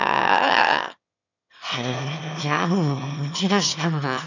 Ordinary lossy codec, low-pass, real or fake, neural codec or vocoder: none; 7.2 kHz; fake; autoencoder, 22.05 kHz, a latent of 192 numbers a frame, VITS, trained on one speaker